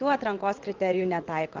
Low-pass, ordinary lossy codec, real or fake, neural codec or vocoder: 7.2 kHz; Opus, 16 kbps; fake; vocoder, 22.05 kHz, 80 mel bands, WaveNeXt